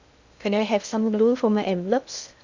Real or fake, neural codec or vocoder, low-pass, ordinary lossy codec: fake; codec, 16 kHz in and 24 kHz out, 0.6 kbps, FocalCodec, streaming, 2048 codes; 7.2 kHz; Opus, 64 kbps